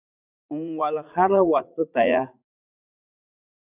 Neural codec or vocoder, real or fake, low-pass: vocoder, 44.1 kHz, 80 mel bands, Vocos; fake; 3.6 kHz